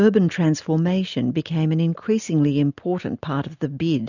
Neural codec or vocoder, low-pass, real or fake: none; 7.2 kHz; real